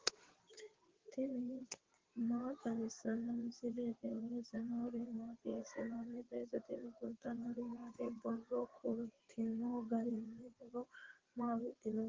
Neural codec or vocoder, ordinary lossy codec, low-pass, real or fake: vocoder, 22.05 kHz, 80 mel bands, WaveNeXt; Opus, 16 kbps; 7.2 kHz; fake